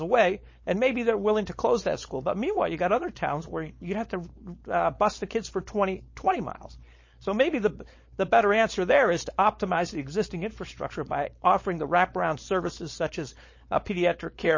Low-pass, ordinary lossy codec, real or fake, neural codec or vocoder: 7.2 kHz; MP3, 32 kbps; fake; codec, 16 kHz, 4.8 kbps, FACodec